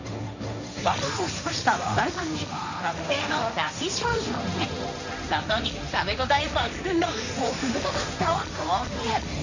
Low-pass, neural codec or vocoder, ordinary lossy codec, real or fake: 7.2 kHz; codec, 16 kHz, 1.1 kbps, Voila-Tokenizer; none; fake